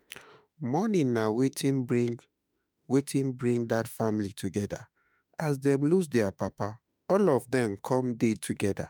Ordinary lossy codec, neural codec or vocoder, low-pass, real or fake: none; autoencoder, 48 kHz, 32 numbers a frame, DAC-VAE, trained on Japanese speech; none; fake